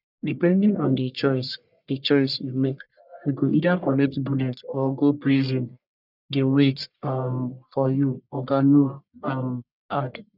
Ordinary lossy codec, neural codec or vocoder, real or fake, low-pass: none; codec, 44.1 kHz, 1.7 kbps, Pupu-Codec; fake; 5.4 kHz